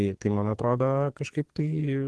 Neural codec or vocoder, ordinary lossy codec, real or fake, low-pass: codec, 44.1 kHz, 2.6 kbps, SNAC; Opus, 16 kbps; fake; 10.8 kHz